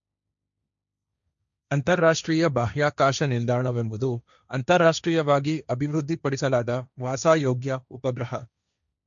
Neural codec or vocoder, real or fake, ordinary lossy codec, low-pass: codec, 16 kHz, 1.1 kbps, Voila-Tokenizer; fake; MP3, 96 kbps; 7.2 kHz